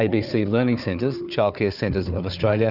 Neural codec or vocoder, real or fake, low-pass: codec, 16 kHz, 4 kbps, FunCodec, trained on Chinese and English, 50 frames a second; fake; 5.4 kHz